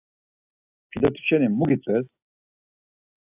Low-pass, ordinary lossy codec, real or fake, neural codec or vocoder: 3.6 kHz; AAC, 32 kbps; real; none